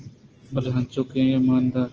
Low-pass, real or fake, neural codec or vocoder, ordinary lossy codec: 7.2 kHz; real; none; Opus, 16 kbps